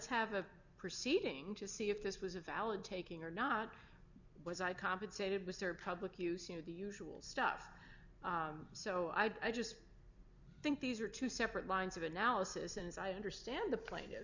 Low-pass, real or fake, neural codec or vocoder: 7.2 kHz; fake; vocoder, 44.1 kHz, 128 mel bands every 256 samples, BigVGAN v2